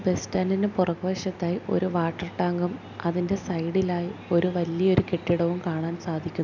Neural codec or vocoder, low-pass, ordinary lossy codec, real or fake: none; 7.2 kHz; none; real